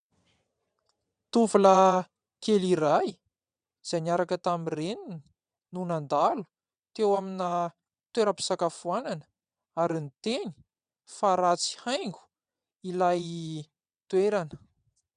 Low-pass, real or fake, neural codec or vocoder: 9.9 kHz; fake; vocoder, 22.05 kHz, 80 mel bands, WaveNeXt